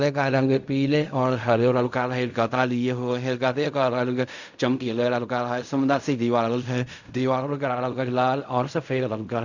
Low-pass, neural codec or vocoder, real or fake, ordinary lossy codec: 7.2 kHz; codec, 16 kHz in and 24 kHz out, 0.4 kbps, LongCat-Audio-Codec, fine tuned four codebook decoder; fake; none